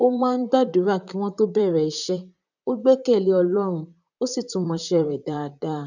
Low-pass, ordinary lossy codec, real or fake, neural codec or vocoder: 7.2 kHz; none; fake; vocoder, 44.1 kHz, 128 mel bands, Pupu-Vocoder